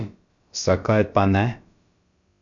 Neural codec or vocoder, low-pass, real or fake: codec, 16 kHz, about 1 kbps, DyCAST, with the encoder's durations; 7.2 kHz; fake